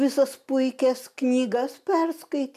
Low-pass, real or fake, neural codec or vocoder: 14.4 kHz; real; none